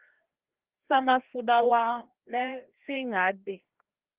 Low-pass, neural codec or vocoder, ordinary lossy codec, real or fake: 3.6 kHz; codec, 16 kHz, 1 kbps, FreqCodec, larger model; Opus, 16 kbps; fake